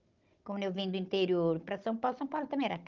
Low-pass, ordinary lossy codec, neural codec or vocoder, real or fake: 7.2 kHz; Opus, 24 kbps; codec, 16 kHz, 16 kbps, FunCodec, trained on Chinese and English, 50 frames a second; fake